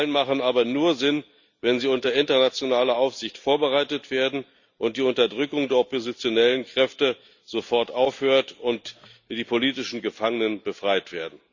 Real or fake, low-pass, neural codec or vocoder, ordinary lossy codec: real; 7.2 kHz; none; Opus, 64 kbps